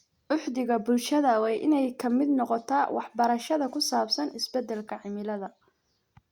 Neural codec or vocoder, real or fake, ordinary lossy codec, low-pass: none; real; Opus, 64 kbps; 19.8 kHz